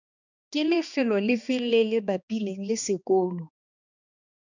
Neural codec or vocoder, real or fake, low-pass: codec, 16 kHz, 2 kbps, X-Codec, HuBERT features, trained on balanced general audio; fake; 7.2 kHz